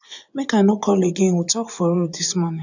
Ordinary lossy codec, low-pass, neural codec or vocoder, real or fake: none; 7.2 kHz; vocoder, 24 kHz, 100 mel bands, Vocos; fake